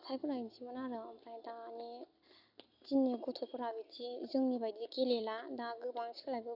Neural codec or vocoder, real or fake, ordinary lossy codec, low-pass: none; real; none; 5.4 kHz